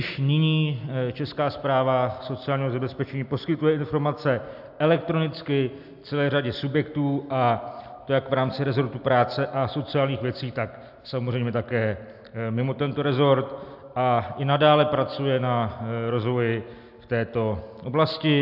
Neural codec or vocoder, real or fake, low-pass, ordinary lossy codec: none; real; 5.4 kHz; AAC, 48 kbps